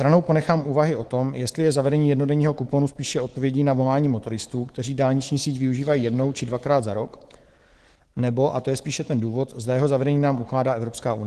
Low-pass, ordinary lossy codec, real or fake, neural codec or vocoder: 10.8 kHz; Opus, 16 kbps; fake; codec, 24 kHz, 3.1 kbps, DualCodec